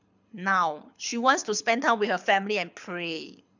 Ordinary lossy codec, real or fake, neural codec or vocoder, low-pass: none; fake; codec, 24 kHz, 6 kbps, HILCodec; 7.2 kHz